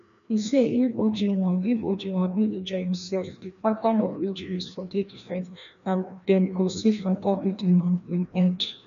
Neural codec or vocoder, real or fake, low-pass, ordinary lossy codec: codec, 16 kHz, 1 kbps, FreqCodec, larger model; fake; 7.2 kHz; none